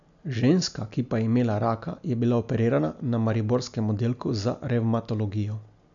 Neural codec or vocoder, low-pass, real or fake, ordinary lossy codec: none; 7.2 kHz; real; none